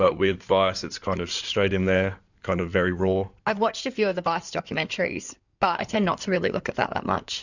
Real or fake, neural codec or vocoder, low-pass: fake; codec, 16 kHz in and 24 kHz out, 2.2 kbps, FireRedTTS-2 codec; 7.2 kHz